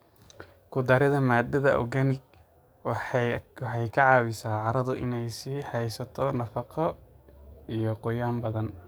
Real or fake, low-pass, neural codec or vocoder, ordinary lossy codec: fake; none; codec, 44.1 kHz, 7.8 kbps, DAC; none